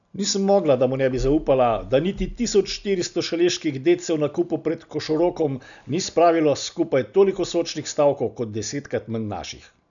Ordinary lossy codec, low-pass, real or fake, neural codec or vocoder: none; 7.2 kHz; real; none